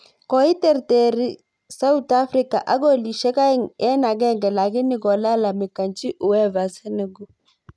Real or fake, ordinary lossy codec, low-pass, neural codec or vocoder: real; none; none; none